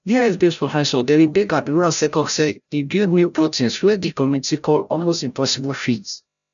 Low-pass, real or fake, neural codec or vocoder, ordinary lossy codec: 7.2 kHz; fake; codec, 16 kHz, 0.5 kbps, FreqCodec, larger model; none